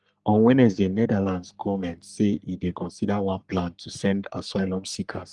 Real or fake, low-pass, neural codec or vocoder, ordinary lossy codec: fake; 10.8 kHz; codec, 44.1 kHz, 3.4 kbps, Pupu-Codec; Opus, 24 kbps